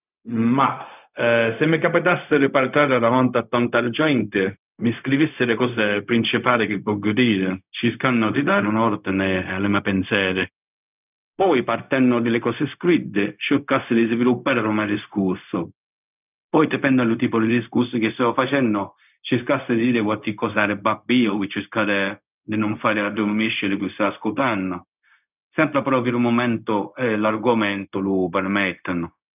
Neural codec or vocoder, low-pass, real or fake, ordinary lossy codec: codec, 16 kHz, 0.4 kbps, LongCat-Audio-Codec; 3.6 kHz; fake; none